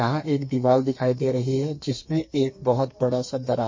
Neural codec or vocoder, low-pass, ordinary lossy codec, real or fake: codec, 32 kHz, 1.9 kbps, SNAC; 7.2 kHz; MP3, 32 kbps; fake